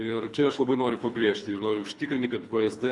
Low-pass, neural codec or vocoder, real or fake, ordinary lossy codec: 10.8 kHz; codec, 24 kHz, 3 kbps, HILCodec; fake; Opus, 64 kbps